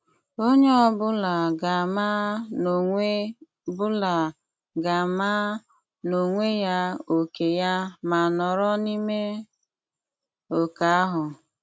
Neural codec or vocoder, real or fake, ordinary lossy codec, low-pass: none; real; none; none